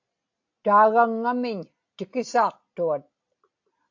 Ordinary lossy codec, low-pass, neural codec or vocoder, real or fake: AAC, 48 kbps; 7.2 kHz; none; real